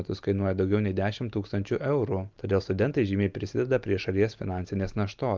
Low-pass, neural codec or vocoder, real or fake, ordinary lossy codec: 7.2 kHz; none; real; Opus, 32 kbps